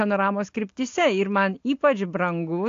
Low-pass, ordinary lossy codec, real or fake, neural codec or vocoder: 7.2 kHz; AAC, 48 kbps; real; none